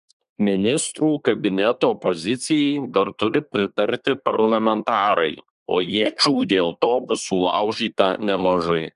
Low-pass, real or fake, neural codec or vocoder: 10.8 kHz; fake; codec, 24 kHz, 1 kbps, SNAC